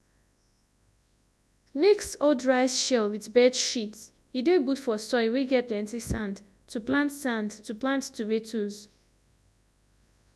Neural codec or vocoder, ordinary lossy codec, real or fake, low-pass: codec, 24 kHz, 0.9 kbps, WavTokenizer, large speech release; none; fake; none